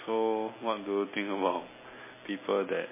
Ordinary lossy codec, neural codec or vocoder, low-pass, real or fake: MP3, 16 kbps; none; 3.6 kHz; real